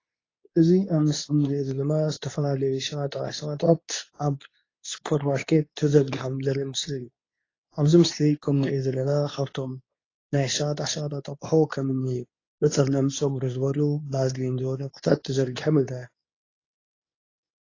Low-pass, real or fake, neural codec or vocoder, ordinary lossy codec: 7.2 kHz; fake; codec, 24 kHz, 0.9 kbps, WavTokenizer, medium speech release version 2; AAC, 32 kbps